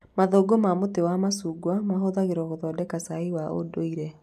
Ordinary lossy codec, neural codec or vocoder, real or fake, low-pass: none; none; real; 14.4 kHz